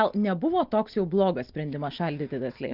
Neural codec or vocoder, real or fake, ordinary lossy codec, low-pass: none; real; Opus, 32 kbps; 5.4 kHz